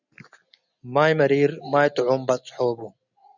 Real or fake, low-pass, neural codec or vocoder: real; 7.2 kHz; none